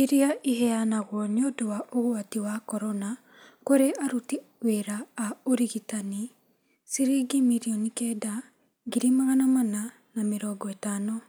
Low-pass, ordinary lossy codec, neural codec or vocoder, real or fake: none; none; none; real